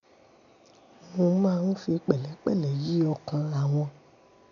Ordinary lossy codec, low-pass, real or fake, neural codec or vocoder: none; 7.2 kHz; real; none